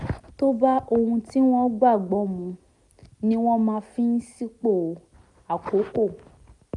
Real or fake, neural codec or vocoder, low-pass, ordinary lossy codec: real; none; 10.8 kHz; none